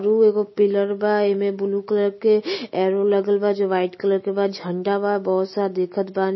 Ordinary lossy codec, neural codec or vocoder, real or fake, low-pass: MP3, 24 kbps; none; real; 7.2 kHz